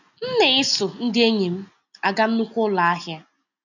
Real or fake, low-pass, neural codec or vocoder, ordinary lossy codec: real; 7.2 kHz; none; none